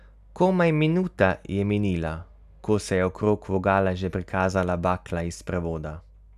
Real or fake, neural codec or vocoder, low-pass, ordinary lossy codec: real; none; 14.4 kHz; none